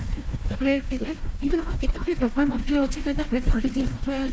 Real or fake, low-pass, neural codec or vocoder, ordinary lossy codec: fake; none; codec, 16 kHz, 1 kbps, FunCodec, trained on Chinese and English, 50 frames a second; none